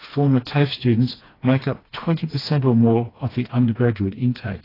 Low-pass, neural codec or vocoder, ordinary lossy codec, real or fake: 5.4 kHz; codec, 16 kHz, 2 kbps, FreqCodec, smaller model; AAC, 24 kbps; fake